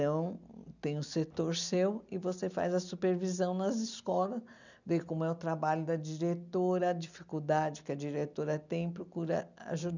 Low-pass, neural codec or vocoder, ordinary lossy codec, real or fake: 7.2 kHz; none; none; real